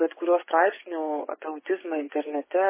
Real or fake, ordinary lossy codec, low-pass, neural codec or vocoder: fake; MP3, 16 kbps; 3.6 kHz; vocoder, 24 kHz, 100 mel bands, Vocos